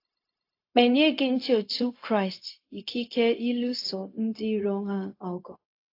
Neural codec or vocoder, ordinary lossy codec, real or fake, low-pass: codec, 16 kHz, 0.4 kbps, LongCat-Audio-Codec; AAC, 32 kbps; fake; 5.4 kHz